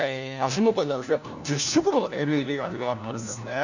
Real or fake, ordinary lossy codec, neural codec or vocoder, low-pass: fake; none; codec, 16 kHz, 1 kbps, FunCodec, trained on LibriTTS, 50 frames a second; 7.2 kHz